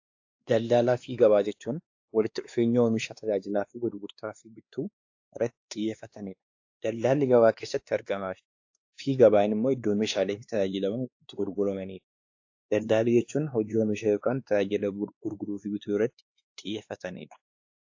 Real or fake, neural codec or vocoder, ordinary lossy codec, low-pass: fake; codec, 16 kHz, 2 kbps, X-Codec, WavLM features, trained on Multilingual LibriSpeech; AAC, 48 kbps; 7.2 kHz